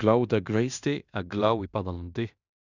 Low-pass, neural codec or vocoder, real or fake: 7.2 kHz; codec, 16 kHz in and 24 kHz out, 0.9 kbps, LongCat-Audio-Codec, fine tuned four codebook decoder; fake